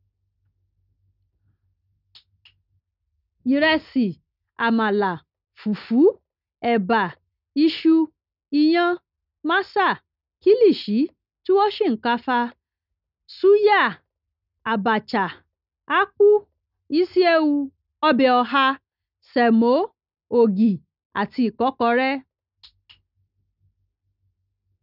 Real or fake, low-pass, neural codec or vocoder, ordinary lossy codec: real; 5.4 kHz; none; none